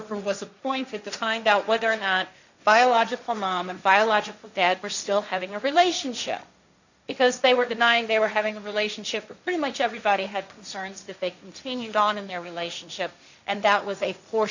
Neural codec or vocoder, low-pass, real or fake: codec, 16 kHz, 1.1 kbps, Voila-Tokenizer; 7.2 kHz; fake